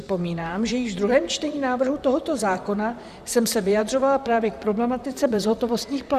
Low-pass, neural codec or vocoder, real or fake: 14.4 kHz; vocoder, 44.1 kHz, 128 mel bands, Pupu-Vocoder; fake